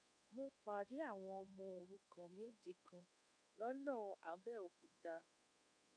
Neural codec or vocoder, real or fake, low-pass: codec, 24 kHz, 1.2 kbps, DualCodec; fake; 9.9 kHz